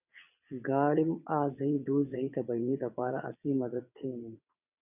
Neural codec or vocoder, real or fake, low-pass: vocoder, 22.05 kHz, 80 mel bands, WaveNeXt; fake; 3.6 kHz